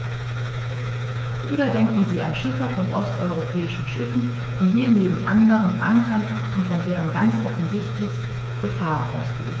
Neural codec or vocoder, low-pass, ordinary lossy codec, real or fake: codec, 16 kHz, 4 kbps, FreqCodec, smaller model; none; none; fake